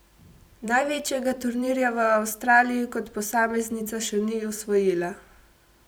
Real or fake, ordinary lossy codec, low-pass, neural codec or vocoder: fake; none; none; vocoder, 44.1 kHz, 128 mel bands every 256 samples, BigVGAN v2